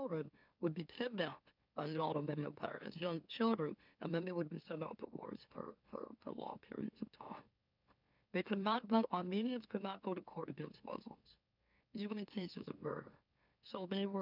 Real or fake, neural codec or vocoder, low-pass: fake; autoencoder, 44.1 kHz, a latent of 192 numbers a frame, MeloTTS; 5.4 kHz